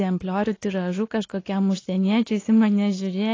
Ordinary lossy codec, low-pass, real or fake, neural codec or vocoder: AAC, 32 kbps; 7.2 kHz; real; none